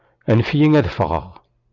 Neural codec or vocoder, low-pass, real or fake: none; 7.2 kHz; real